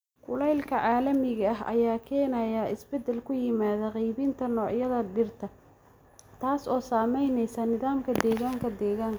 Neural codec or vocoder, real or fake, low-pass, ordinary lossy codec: none; real; none; none